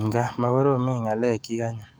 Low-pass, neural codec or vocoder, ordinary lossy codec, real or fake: none; codec, 44.1 kHz, 7.8 kbps, DAC; none; fake